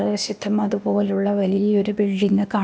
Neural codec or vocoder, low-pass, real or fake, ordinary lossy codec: codec, 16 kHz, 0.8 kbps, ZipCodec; none; fake; none